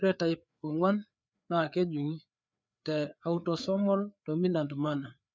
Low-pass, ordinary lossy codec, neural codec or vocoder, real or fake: none; none; codec, 16 kHz, 4 kbps, FreqCodec, larger model; fake